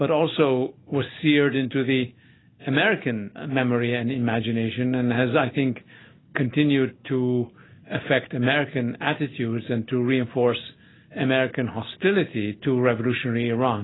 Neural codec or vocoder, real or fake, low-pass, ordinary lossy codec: none; real; 7.2 kHz; AAC, 16 kbps